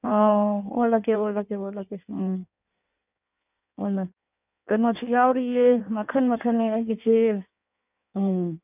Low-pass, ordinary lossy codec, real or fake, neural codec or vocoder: 3.6 kHz; none; fake; codec, 16 kHz in and 24 kHz out, 1.1 kbps, FireRedTTS-2 codec